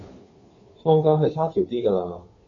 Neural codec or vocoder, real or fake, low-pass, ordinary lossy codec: codec, 16 kHz, 2 kbps, FunCodec, trained on Chinese and English, 25 frames a second; fake; 7.2 kHz; AAC, 32 kbps